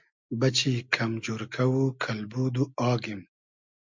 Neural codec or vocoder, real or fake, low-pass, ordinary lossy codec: none; real; 7.2 kHz; MP3, 64 kbps